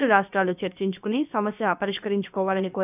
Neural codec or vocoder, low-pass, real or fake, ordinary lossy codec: codec, 16 kHz, about 1 kbps, DyCAST, with the encoder's durations; 3.6 kHz; fake; none